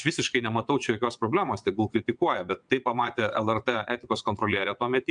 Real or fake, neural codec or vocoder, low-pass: fake; vocoder, 22.05 kHz, 80 mel bands, WaveNeXt; 9.9 kHz